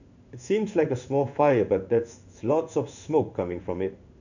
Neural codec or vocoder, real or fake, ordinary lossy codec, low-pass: codec, 16 kHz in and 24 kHz out, 1 kbps, XY-Tokenizer; fake; none; 7.2 kHz